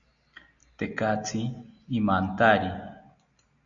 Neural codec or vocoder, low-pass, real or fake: none; 7.2 kHz; real